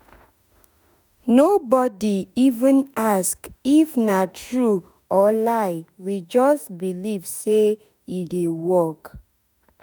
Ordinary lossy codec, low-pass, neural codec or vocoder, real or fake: none; none; autoencoder, 48 kHz, 32 numbers a frame, DAC-VAE, trained on Japanese speech; fake